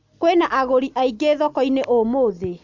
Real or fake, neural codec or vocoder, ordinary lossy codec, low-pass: real; none; MP3, 64 kbps; 7.2 kHz